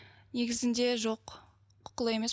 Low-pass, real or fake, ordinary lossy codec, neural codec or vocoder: none; real; none; none